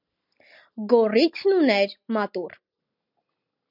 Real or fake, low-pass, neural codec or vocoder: real; 5.4 kHz; none